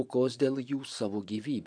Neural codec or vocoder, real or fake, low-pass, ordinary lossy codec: vocoder, 24 kHz, 100 mel bands, Vocos; fake; 9.9 kHz; AAC, 64 kbps